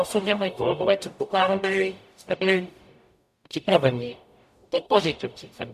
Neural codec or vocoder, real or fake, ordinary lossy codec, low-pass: codec, 44.1 kHz, 0.9 kbps, DAC; fake; MP3, 96 kbps; 14.4 kHz